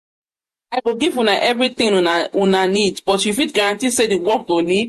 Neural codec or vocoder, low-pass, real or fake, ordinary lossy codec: vocoder, 48 kHz, 128 mel bands, Vocos; 14.4 kHz; fake; MP3, 64 kbps